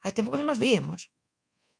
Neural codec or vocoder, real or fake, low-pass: codec, 24 kHz, 1.2 kbps, DualCodec; fake; 9.9 kHz